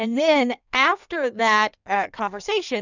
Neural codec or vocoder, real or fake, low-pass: codec, 16 kHz in and 24 kHz out, 1.1 kbps, FireRedTTS-2 codec; fake; 7.2 kHz